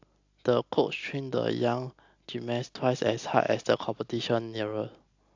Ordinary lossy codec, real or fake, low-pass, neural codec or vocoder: AAC, 48 kbps; real; 7.2 kHz; none